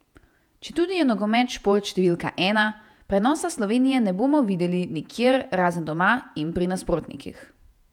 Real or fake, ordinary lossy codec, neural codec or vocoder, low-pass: fake; none; vocoder, 48 kHz, 128 mel bands, Vocos; 19.8 kHz